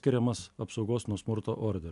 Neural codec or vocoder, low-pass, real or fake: none; 10.8 kHz; real